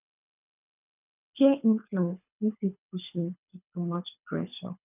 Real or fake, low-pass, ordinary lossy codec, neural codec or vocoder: fake; 3.6 kHz; none; codec, 24 kHz, 3 kbps, HILCodec